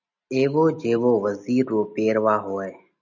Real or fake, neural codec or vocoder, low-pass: real; none; 7.2 kHz